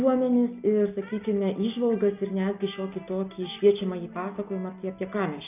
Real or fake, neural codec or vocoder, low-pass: real; none; 3.6 kHz